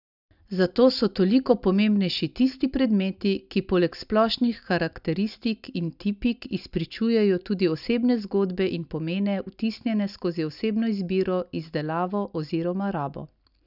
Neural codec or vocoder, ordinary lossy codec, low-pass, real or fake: none; none; 5.4 kHz; real